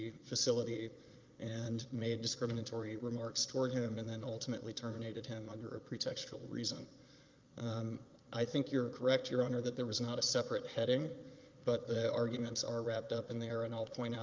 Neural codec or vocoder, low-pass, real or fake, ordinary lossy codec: vocoder, 22.05 kHz, 80 mel bands, Vocos; 7.2 kHz; fake; Opus, 24 kbps